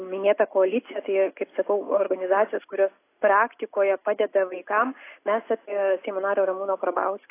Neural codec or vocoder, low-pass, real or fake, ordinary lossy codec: none; 3.6 kHz; real; AAC, 24 kbps